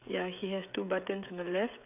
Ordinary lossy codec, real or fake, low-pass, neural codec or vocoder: none; fake; 3.6 kHz; codec, 16 kHz, 16 kbps, FreqCodec, smaller model